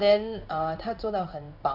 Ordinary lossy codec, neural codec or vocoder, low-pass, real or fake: none; codec, 16 kHz in and 24 kHz out, 1 kbps, XY-Tokenizer; 5.4 kHz; fake